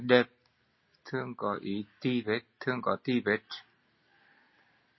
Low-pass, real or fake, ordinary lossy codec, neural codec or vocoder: 7.2 kHz; real; MP3, 24 kbps; none